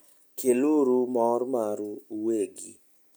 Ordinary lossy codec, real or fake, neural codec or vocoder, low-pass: none; real; none; none